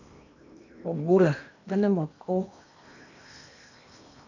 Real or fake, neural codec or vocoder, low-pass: fake; codec, 16 kHz in and 24 kHz out, 0.8 kbps, FocalCodec, streaming, 65536 codes; 7.2 kHz